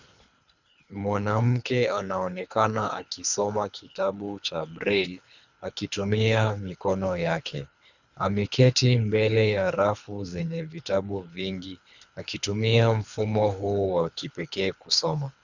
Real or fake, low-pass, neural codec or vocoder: fake; 7.2 kHz; codec, 24 kHz, 3 kbps, HILCodec